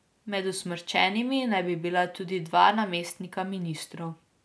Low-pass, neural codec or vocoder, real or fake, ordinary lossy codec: none; none; real; none